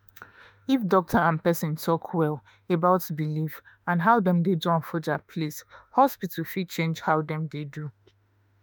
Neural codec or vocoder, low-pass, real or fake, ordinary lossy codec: autoencoder, 48 kHz, 32 numbers a frame, DAC-VAE, trained on Japanese speech; none; fake; none